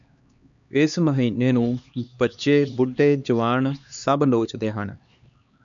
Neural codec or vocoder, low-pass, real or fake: codec, 16 kHz, 2 kbps, X-Codec, HuBERT features, trained on LibriSpeech; 7.2 kHz; fake